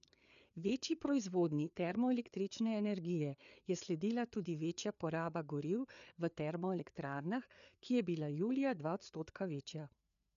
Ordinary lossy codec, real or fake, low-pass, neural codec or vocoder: none; fake; 7.2 kHz; codec, 16 kHz, 4 kbps, FreqCodec, larger model